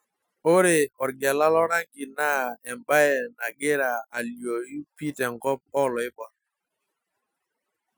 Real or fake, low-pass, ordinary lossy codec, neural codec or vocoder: real; none; none; none